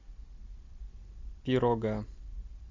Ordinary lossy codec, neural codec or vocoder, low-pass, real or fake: MP3, 64 kbps; none; 7.2 kHz; real